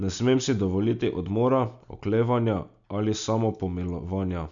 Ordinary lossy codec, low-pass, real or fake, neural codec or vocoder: none; 7.2 kHz; real; none